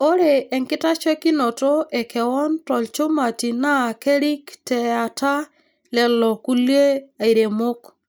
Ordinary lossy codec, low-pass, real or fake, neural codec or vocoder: none; none; real; none